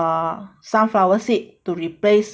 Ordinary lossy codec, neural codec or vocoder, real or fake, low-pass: none; none; real; none